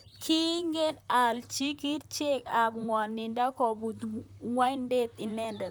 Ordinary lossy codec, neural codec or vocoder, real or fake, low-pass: none; vocoder, 44.1 kHz, 128 mel bands, Pupu-Vocoder; fake; none